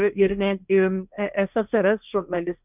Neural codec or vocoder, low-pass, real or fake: codec, 16 kHz, 1.1 kbps, Voila-Tokenizer; 3.6 kHz; fake